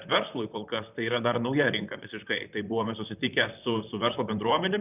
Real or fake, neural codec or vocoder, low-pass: fake; vocoder, 44.1 kHz, 128 mel bands, Pupu-Vocoder; 3.6 kHz